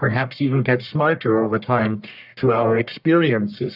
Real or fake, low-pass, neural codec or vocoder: fake; 5.4 kHz; codec, 44.1 kHz, 1.7 kbps, Pupu-Codec